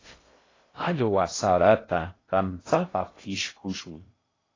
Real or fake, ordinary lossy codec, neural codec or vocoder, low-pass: fake; AAC, 32 kbps; codec, 16 kHz in and 24 kHz out, 0.6 kbps, FocalCodec, streaming, 2048 codes; 7.2 kHz